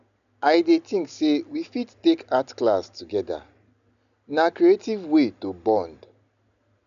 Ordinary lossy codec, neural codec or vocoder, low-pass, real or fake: none; none; 7.2 kHz; real